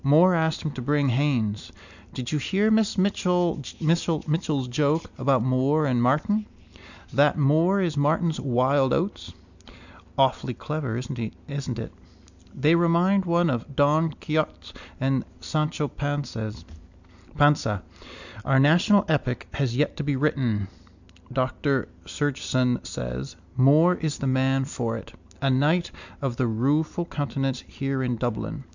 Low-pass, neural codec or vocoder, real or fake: 7.2 kHz; none; real